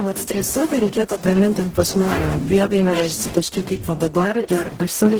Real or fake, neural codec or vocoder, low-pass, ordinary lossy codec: fake; codec, 44.1 kHz, 0.9 kbps, DAC; 19.8 kHz; Opus, 16 kbps